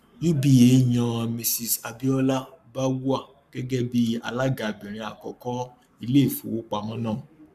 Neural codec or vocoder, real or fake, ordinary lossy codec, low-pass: codec, 44.1 kHz, 7.8 kbps, Pupu-Codec; fake; none; 14.4 kHz